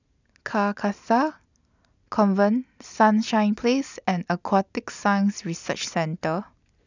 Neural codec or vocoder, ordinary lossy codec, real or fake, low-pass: none; none; real; 7.2 kHz